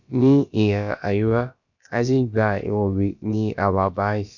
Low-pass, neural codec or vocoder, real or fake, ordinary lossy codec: 7.2 kHz; codec, 16 kHz, about 1 kbps, DyCAST, with the encoder's durations; fake; none